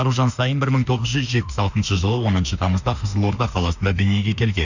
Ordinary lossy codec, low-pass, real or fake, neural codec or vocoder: none; 7.2 kHz; fake; autoencoder, 48 kHz, 32 numbers a frame, DAC-VAE, trained on Japanese speech